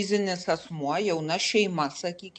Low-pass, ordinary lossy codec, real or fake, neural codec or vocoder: 9.9 kHz; MP3, 64 kbps; real; none